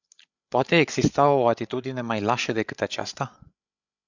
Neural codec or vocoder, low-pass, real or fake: codec, 16 kHz, 8 kbps, FreqCodec, larger model; 7.2 kHz; fake